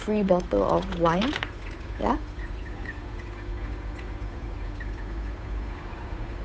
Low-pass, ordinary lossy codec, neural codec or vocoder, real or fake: none; none; codec, 16 kHz, 8 kbps, FunCodec, trained on Chinese and English, 25 frames a second; fake